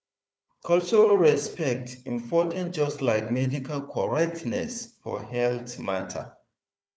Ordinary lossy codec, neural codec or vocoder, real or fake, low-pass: none; codec, 16 kHz, 4 kbps, FunCodec, trained on Chinese and English, 50 frames a second; fake; none